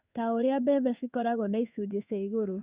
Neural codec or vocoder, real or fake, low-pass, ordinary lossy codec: codec, 16 kHz in and 24 kHz out, 1 kbps, XY-Tokenizer; fake; 3.6 kHz; Opus, 64 kbps